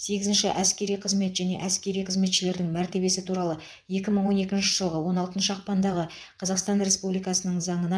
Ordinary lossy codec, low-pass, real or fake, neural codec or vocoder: none; none; fake; vocoder, 22.05 kHz, 80 mel bands, WaveNeXt